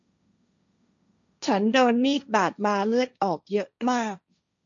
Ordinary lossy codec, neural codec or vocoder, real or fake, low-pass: none; codec, 16 kHz, 1.1 kbps, Voila-Tokenizer; fake; 7.2 kHz